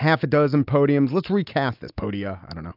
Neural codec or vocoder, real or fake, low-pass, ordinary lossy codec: none; real; 5.4 kHz; AAC, 48 kbps